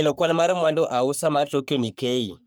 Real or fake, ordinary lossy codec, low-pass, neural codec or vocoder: fake; none; none; codec, 44.1 kHz, 3.4 kbps, Pupu-Codec